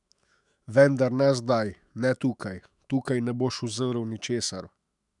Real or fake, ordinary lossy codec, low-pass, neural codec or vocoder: fake; none; 10.8 kHz; autoencoder, 48 kHz, 128 numbers a frame, DAC-VAE, trained on Japanese speech